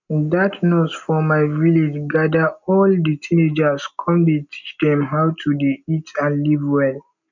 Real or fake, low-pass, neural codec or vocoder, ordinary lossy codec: real; 7.2 kHz; none; none